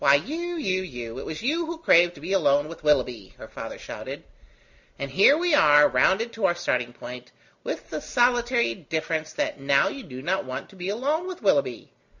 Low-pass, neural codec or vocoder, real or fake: 7.2 kHz; none; real